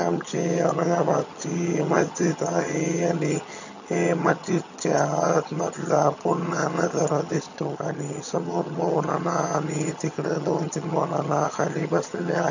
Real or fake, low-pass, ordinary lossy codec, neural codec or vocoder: fake; 7.2 kHz; none; vocoder, 22.05 kHz, 80 mel bands, HiFi-GAN